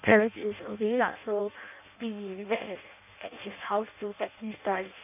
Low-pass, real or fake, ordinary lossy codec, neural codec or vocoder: 3.6 kHz; fake; none; codec, 16 kHz in and 24 kHz out, 0.6 kbps, FireRedTTS-2 codec